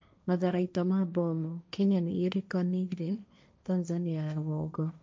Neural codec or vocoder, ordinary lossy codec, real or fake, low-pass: codec, 16 kHz, 1.1 kbps, Voila-Tokenizer; none; fake; none